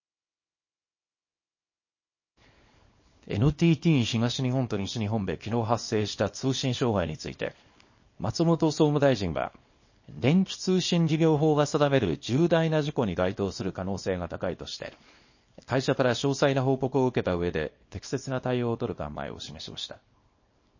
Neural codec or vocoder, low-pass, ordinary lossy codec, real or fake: codec, 24 kHz, 0.9 kbps, WavTokenizer, small release; 7.2 kHz; MP3, 32 kbps; fake